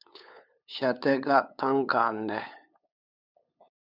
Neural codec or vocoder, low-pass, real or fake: codec, 16 kHz, 8 kbps, FunCodec, trained on LibriTTS, 25 frames a second; 5.4 kHz; fake